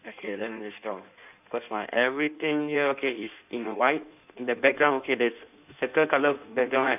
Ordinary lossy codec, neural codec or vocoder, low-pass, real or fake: none; codec, 16 kHz in and 24 kHz out, 1.1 kbps, FireRedTTS-2 codec; 3.6 kHz; fake